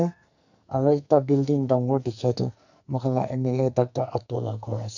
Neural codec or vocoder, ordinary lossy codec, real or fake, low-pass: codec, 44.1 kHz, 2.6 kbps, SNAC; none; fake; 7.2 kHz